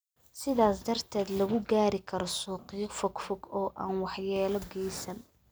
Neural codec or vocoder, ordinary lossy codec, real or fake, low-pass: none; none; real; none